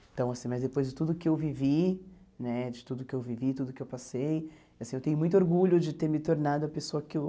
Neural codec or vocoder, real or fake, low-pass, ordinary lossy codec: none; real; none; none